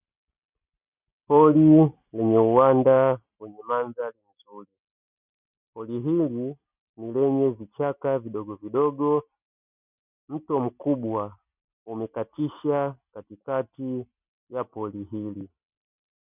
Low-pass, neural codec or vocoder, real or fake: 3.6 kHz; none; real